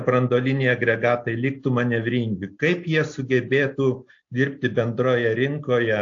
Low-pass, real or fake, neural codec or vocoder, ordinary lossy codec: 7.2 kHz; real; none; AAC, 48 kbps